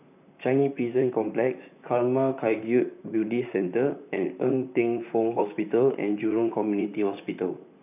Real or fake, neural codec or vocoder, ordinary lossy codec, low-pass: fake; vocoder, 44.1 kHz, 128 mel bands, Pupu-Vocoder; none; 3.6 kHz